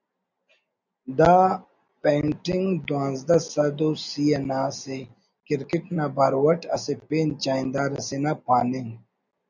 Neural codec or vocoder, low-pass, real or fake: none; 7.2 kHz; real